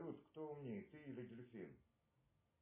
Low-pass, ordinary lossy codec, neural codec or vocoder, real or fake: 3.6 kHz; MP3, 16 kbps; none; real